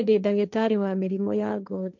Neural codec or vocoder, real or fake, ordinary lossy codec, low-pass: codec, 16 kHz, 1.1 kbps, Voila-Tokenizer; fake; none; 7.2 kHz